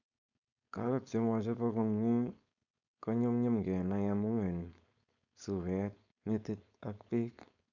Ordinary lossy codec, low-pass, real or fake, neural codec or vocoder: none; 7.2 kHz; fake; codec, 16 kHz, 4.8 kbps, FACodec